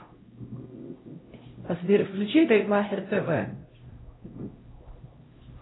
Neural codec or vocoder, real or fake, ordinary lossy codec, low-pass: codec, 16 kHz, 0.5 kbps, X-Codec, HuBERT features, trained on LibriSpeech; fake; AAC, 16 kbps; 7.2 kHz